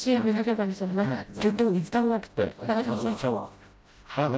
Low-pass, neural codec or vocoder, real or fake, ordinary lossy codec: none; codec, 16 kHz, 0.5 kbps, FreqCodec, smaller model; fake; none